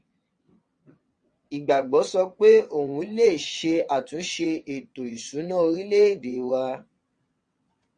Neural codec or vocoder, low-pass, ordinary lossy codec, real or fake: vocoder, 22.05 kHz, 80 mel bands, WaveNeXt; 9.9 kHz; MP3, 48 kbps; fake